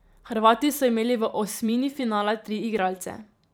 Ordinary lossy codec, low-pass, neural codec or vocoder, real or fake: none; none; vocoder, 44.1 kHz, 128 mel bands every 512 samples, BigVGAN v2; fake